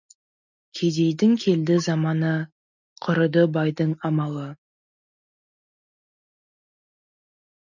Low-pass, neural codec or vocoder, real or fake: 7.2 kHz; none; real